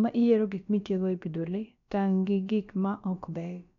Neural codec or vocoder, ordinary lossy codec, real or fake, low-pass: codec, 16 kHz, about 1 kbps, DyCAST, with the encoder's durations; none; fake; 7.2 kHz